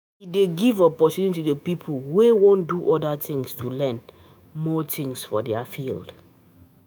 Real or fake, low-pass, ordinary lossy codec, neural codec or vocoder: fake; none; none; autoencoder, 48 kHz, 128 numbers a frame, DAC-VAE, trained on Japanese speech